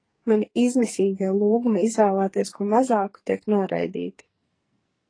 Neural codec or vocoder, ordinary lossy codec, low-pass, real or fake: codec, 44.1 kHz, 2.6 kbps, SNAC; AAC, 32 kbps; 9.9 kHz; fake